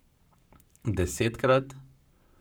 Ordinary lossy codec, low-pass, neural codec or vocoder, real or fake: none; none; codec, 44.1 kHz, 7.8 kbps, Pupu-Codec; fake